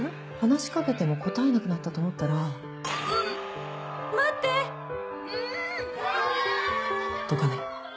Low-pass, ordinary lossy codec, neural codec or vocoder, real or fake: none; none; none; real